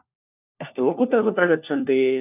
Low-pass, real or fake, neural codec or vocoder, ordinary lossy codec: 3.6 kHz; fake; codec, 16 kHz, 1 kbps, FunCodec, trained on LibriTTS, 50 frames a second; none